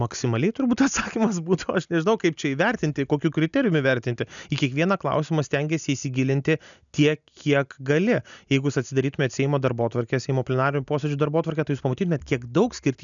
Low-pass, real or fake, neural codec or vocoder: 7.2 kHz; real; none